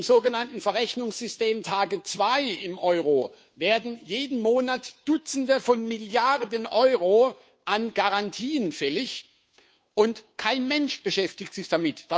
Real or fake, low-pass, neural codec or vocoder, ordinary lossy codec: fake; none; codec, 16 kHz, 2 kbps, FunCodec, trained on Chinese and English, 25 frames a second; none